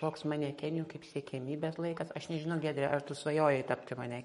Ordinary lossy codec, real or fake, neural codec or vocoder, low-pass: MP3, 48 kbps; fake; codec, 44.1 kHz, 7.8 kbps, Pupu-Codec; 19.8 kHz